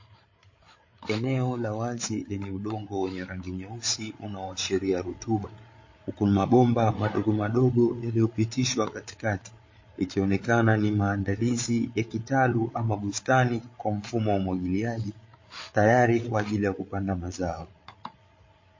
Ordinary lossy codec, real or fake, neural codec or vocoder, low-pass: MP3, 32 kbps; fake; codec, 16 kHz, 8 kbps, FreqCodec, larger model; 7.2 kHz